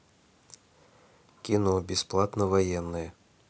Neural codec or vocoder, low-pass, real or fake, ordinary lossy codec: none; none; real; none